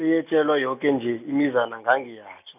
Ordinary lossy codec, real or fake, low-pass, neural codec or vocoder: none; real; 3.6 kHz; none